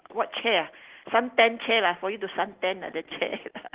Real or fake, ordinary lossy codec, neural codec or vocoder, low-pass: real; Opus, 16 kbps; none; 3.6 kHz